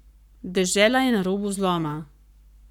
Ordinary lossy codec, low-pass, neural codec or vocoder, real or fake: none; 19.8 kHz; codec, 44.1 kHz, 7.8 kbps, Pupu-Codec; fake